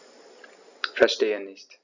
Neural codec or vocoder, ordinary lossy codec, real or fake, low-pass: none; Opus, 64 kbps; real; 7.2 kHz